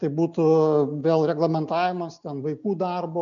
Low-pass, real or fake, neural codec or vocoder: 7.2 kHz; real; none